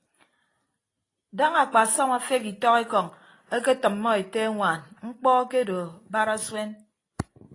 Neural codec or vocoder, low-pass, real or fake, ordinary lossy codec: none; 10.8 kHz; real; AAC, 32 kbps